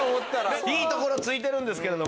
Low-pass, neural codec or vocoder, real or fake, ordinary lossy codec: none; none; real; none